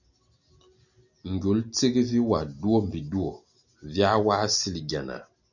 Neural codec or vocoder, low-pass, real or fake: none; 7.2 kHz; real